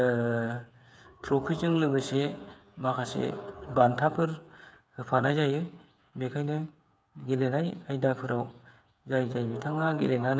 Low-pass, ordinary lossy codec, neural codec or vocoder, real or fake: none; none; codec, 16 kHz, 8 kbps, FreqCodec, smaller model; fake